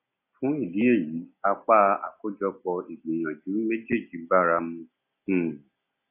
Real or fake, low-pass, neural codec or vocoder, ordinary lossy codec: real; 3.6 kHz; none; AAC, 32 kbps